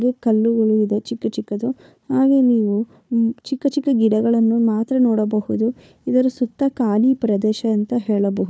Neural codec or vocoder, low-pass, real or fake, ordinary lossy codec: codec, 16 kHz, 4 kbps, FunCodec, trained on Chinese and English, 50 frames a second; none; fake; none